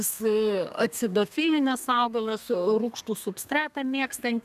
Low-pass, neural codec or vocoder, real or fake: 14.4 kHz; codec, 32 kHz, 1.9 kbps, SNAC; fake